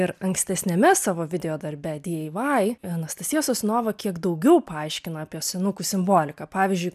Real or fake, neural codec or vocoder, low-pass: real; none; 14.4 kHz